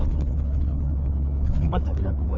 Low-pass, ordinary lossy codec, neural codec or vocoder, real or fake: 7.2 kHz; none; codec, 16 kHz, 4 kbps, FreqCodec, larger model; fake